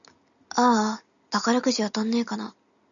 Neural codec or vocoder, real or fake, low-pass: none; real; 7.2 kHz